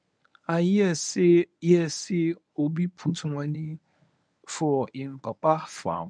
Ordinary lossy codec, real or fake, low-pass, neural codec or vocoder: none; fake; 9.9 kHz; codec, 24 kHz, 0.9 kbps, WavTokenizer, medium speech release version 1